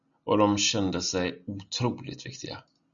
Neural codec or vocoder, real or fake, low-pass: none; real; 7.2 kHz